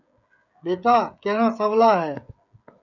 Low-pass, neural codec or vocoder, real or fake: 7.2 kHz; codec, 16 kHz, 16 kbps, FreqCodec, smaller model; fake